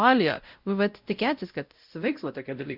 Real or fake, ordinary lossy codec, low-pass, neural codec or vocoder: fake; Opus, 64 kbps; 5.4 kHz; codec, 16 kHz, 0.5 kbps, X-Codec, WavLM features, trained on Multilingual LibriSpeech